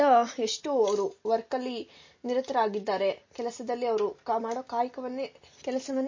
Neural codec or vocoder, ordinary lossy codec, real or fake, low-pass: none; MP3, 32 kbps; real; 7.2 kHz